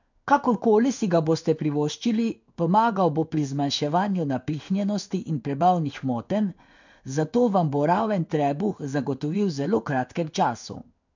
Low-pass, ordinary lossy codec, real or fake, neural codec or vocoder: 7.2 kHz; none; fake; codec, 16 kHz in and 24 kHz out, 1 kbps, XY-Tokenizer